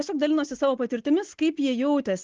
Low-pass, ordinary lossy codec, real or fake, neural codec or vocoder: 7.2 kHz; Opus, 16 kbps; real; none